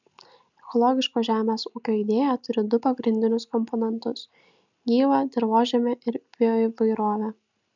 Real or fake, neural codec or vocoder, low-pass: real; none; 7.2 kHz